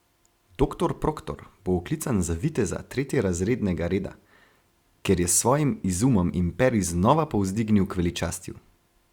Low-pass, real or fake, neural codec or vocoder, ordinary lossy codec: 19.8 kHz; real; none; Opus, 64 kbps